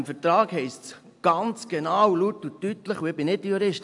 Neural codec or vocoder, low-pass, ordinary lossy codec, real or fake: none; 10.8 kHz; AAC, 64 kbps; real